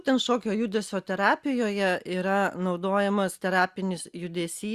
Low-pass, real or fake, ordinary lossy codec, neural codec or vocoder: 14.4 kHz; real; Opus, 64 kbps; none